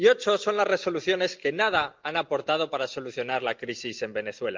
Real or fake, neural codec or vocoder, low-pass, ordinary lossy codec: real; none; 7.2 kHz; Opus, 24 kbps